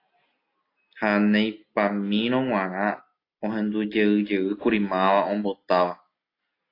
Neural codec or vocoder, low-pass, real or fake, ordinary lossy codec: none; 5.4 kHz; real; AAC, 32 kbps